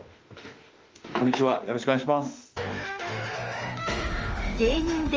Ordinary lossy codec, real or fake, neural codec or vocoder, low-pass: Opus, 24 kbps; fake; autoencoder, 48 kHz, 32 numbers a frame, DAC-VAE, trained on Japanese speech; 7.2 kHz